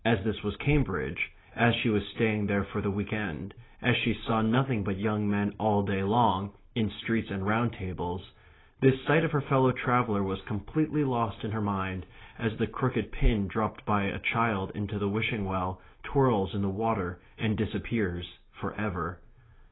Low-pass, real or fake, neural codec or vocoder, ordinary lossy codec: 7.2 kHz; real; none; AAC, 16 kbps